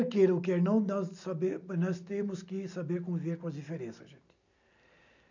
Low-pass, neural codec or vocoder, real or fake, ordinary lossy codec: 7.2 kHz; none; real; none